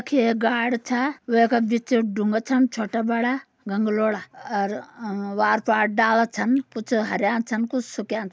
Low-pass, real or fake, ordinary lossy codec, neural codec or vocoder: none; real; none; none